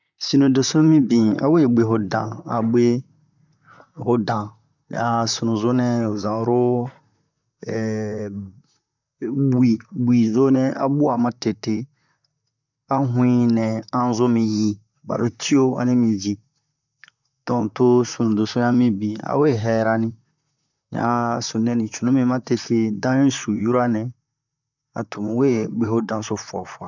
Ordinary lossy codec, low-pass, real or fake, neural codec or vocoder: none; 7.2 kHz; fake; vocoder, 44.1 kHz, 128 mel bands, Pupu-Vocoder